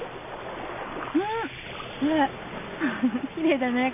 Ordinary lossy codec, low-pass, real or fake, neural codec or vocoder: none; 3.6 kHz; real; none